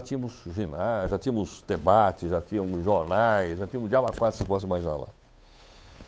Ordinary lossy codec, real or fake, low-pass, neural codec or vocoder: none; fake; none; codec, 16 kHz, 8 kbps, FunCodec, trained on Chinese and English, 25 frames a second